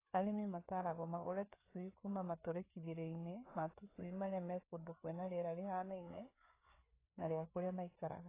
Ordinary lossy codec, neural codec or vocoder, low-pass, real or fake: AAC, 16 kbps; codec, 16 kHz, 4 kbps, FreqCodec, larger model; 3.6 kHz; fake